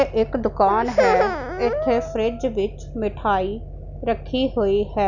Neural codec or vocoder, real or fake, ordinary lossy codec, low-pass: none; real; none; 7.2 kHz